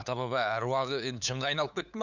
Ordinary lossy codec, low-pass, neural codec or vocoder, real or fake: none; 7.2 kHz; codec, 16 kHz, 8 kbps, FunCodec, trained on LibriTTS, 25 frames a second; fake